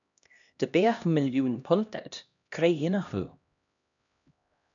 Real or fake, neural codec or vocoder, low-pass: fake; codec, 16 kHz, 1 kbps, X-Codec, HuBERT features, trained on LibriSpeech; 7.2 kHz